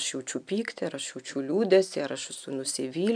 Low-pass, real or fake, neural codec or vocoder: 9.9 kHz; real; none